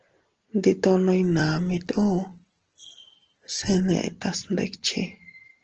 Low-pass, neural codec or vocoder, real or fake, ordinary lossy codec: 7.2 kHz; none; real; Opus, 16 kbps